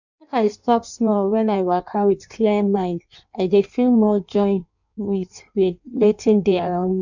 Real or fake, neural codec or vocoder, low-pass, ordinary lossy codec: fake; codec, 16 kHz in and 24 kHz out, 1.1 kbps, FireRedTTS-2 codec; 7.2 kHz; none